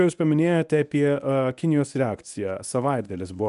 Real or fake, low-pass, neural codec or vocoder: fake; 10.8 kHz; codec, 24 kHz, 0.9 kbps, WavTokenizer, medium speech release version 1